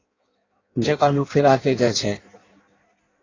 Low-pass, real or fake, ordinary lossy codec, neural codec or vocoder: 7.2 kHz; fake; AAC, 32 kbps; codec, 16 kHz in and 24 kHz out, 0.6 kbps, FireRedTTS-2 codec